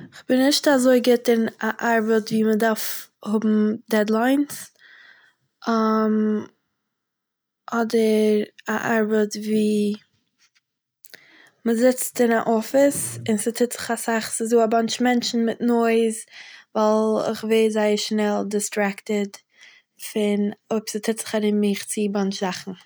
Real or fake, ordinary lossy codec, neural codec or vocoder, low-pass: real; none; none; none